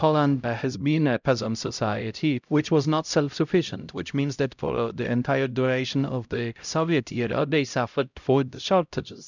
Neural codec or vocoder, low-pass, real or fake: codec, 16 kHz, 0.5 kbps, X-Codec, HuBERT features, trained on LibriSpeech; 7.2 kHz; fake